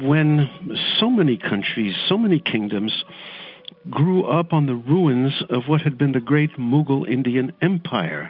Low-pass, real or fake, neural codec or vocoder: 5.4 kHz; real; none